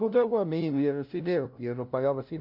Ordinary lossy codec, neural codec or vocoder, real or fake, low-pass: none; codec, 16 kHz, 0.5 kbps, FunCodec, trained on LibriTTS, 25 frames a second; fake; 5.4 kHz